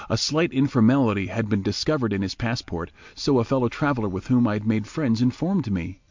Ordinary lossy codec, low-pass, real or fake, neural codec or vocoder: MP3, 64 kbps; 7.2 kHz; real; none